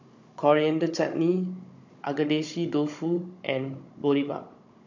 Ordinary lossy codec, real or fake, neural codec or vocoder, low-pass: MP3, 48 kbps; fake; codec, 16 kHz, 16 kbps, FunCodec, trained on Chinese and English, 50 frames a second; 7.2 kHz